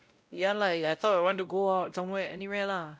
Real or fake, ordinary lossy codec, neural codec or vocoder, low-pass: fake; none; codec, 16 kHz, 0.5 kbps, X-Codec, WavLM features, trained on Multilingual LibriSpeech; none